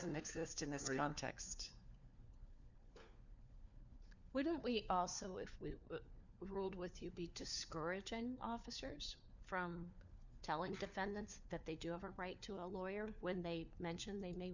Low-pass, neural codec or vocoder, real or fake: 7.2 kHz; codec, 16 kHz, 4 kbps, FunCodec, trained on LibriTTS, 50 frames a second; fake